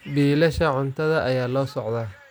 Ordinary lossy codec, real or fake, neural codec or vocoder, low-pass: none; real; none; none